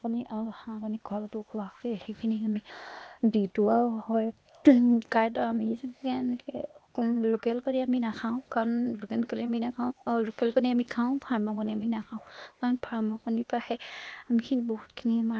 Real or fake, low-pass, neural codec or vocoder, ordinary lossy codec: fake; none; codec, 16 kHz, 0.8 kbps, ZipCodec; none